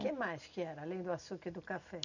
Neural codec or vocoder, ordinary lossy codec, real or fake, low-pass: none; none; real; 7.2 kHz